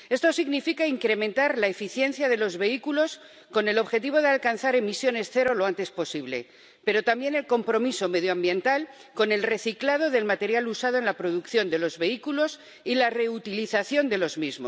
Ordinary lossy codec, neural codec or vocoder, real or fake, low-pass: none; none; real; none